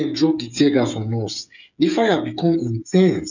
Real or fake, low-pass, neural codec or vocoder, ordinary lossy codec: fake; 7.2 kHz; codec, 16 kHz, 8 kbps, FreqCodec, smaller model; none